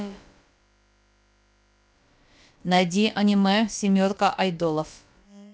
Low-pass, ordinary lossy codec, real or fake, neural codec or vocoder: none; none; fake; codec, 16 kHz, about 1 kbps, DyCAST, with the encoder's durations